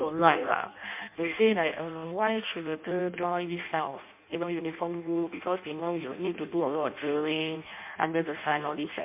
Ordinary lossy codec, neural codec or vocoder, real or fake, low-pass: MP3, 32 kbps; codec, 16 kHz in and 24 kHz out, 0.6 kbps, FireRedTTS-2 codec; fake; 3.6 kHz